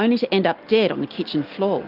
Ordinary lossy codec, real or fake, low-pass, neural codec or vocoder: Opus, 24 kbps; real; 5.4 kHz; none